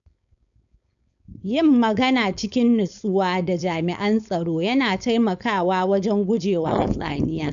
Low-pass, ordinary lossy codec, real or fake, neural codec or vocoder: 7.2 kHz; none; fake; codec, 16 kHz, 4.8 kbps, FACodec